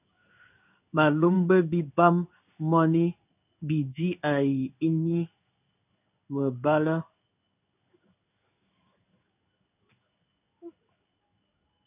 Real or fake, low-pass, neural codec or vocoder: fake; 3.6 kHz; codec, 16 kHz in and 24 kHz out, 1 kbps, XY-Tokenizer